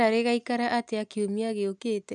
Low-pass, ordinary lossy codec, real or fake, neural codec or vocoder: 9.9 kHz; none; real; none